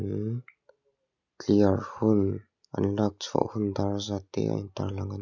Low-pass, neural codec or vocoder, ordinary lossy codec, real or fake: 7.2 kHz; none; none; real